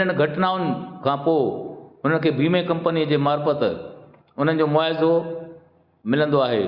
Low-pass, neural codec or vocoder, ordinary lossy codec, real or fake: 5.4 kHz; none; Opus, 64 kbps; real